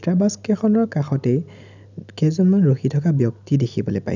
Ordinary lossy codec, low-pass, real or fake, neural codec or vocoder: none; 7.2 kHz; real; none